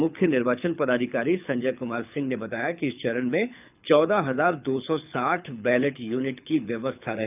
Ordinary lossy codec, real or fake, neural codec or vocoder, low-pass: none; fake; codec, 24 kHz, 6 kbps, HILCodec; 3.6 kHz